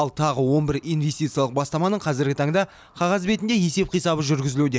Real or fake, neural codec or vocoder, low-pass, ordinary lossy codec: real; none; none; none